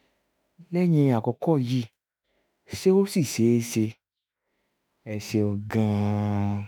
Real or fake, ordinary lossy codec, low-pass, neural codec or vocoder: fake; none; none; autoencoder, 48 kHz, 32 numbers a frame, DAC-VAE, trained on Japanese speech